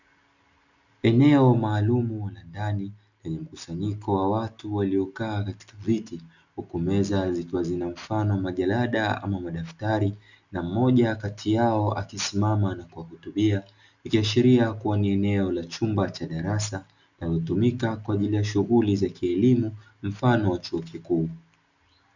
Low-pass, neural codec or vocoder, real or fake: 7.2 kHz; none; real